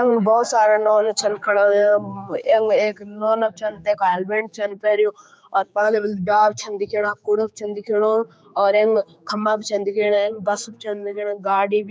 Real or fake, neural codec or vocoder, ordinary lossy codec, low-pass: fake; codec, 16 kHz, 4 kbps, X-Codec, HuBERT features, trained on general audio; none; none